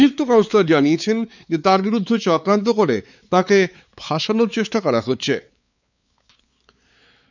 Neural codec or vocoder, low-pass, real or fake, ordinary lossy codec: codec, 16 kHz, 4 kbps, X-Codec, WavLM features, trained on Multilingual LibriSpeech; 7.2 kHz; fake; none